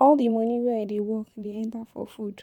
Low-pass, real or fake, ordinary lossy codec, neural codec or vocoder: 19.8 kHz; fake; none; vocoder, 44.1 kHz, 128 mel bands, Pupu-Vocoder